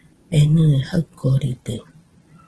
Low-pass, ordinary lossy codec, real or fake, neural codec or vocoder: 10.8 kHz; Opus, 16 kbps; fake; vocoder, 44.1 kHz, 128 mel bands every 512 samples, BigVGAN v2